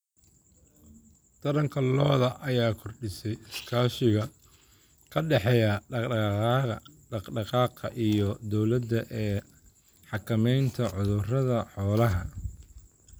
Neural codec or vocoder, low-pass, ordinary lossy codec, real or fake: none; none; none; real